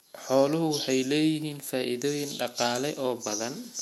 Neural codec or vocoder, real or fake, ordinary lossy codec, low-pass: autoencoder, 48 kHz, 128 numbers a frame, DAC-VAE, trained on Japanese speech; fake; MP3, 64 kbps; 19.8 kHz